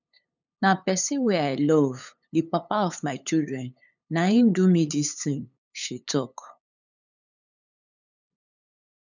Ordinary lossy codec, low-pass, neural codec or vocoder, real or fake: none; 7.2 kHz; codec, 16 kHz, 8 kbps, FunCodec, trained on LibriTTS, 25 frames a second; fake